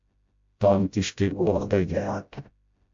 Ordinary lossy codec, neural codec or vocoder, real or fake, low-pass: AAC, 64 kbps; codec, 16 kHz, 0.5 kbps, FreqCodec, smaller model; fake; 7.2 kHz